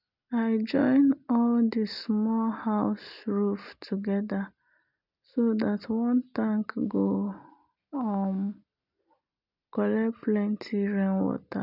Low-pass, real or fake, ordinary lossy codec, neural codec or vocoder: 5.4 kHz; real; none; none